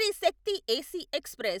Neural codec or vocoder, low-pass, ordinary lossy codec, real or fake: none; none; none; real